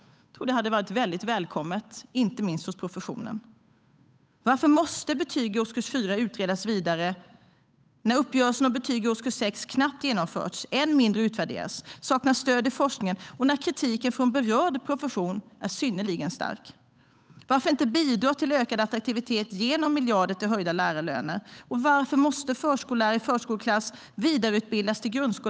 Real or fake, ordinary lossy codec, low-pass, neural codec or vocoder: fake; none; none; codec, 16 kHz, 8 kbps, FunCodec, trained on Chinese and English, 25 frames a second